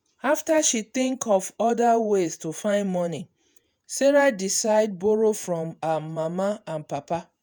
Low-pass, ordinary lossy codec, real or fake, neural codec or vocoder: none; none; fake; vocoder, 48 kHz, 128 mel bands, Vocos